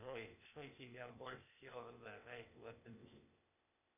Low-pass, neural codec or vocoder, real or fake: 3.6 kHz; codec, 16 kHz, 0.8 kbps, ZipCodec; fake